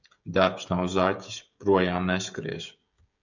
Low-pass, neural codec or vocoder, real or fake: 7.2 kHz; codec, 16 kHz, 16 kbps, FreqCodec, smaller model; fake